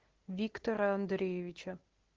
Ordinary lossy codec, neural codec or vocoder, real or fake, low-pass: Opus, 16 kbps; none; real; 7.2 kHz